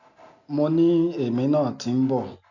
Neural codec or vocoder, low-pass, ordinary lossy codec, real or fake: none; 7.2 kHz; none; real